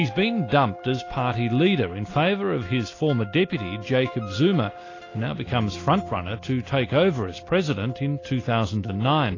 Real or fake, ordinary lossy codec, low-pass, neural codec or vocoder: real; AAC, 32 kbps; 7.2 kHz; none